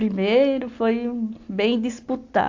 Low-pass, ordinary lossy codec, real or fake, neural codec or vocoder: 7.2 kHz; AAC, 48 kbps; real; none